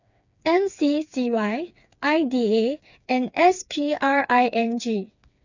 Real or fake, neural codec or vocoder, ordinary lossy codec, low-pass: fake; codec, 16 kHz, 4 kbps, FreqCodec, smaller model; none; 7.2 kHz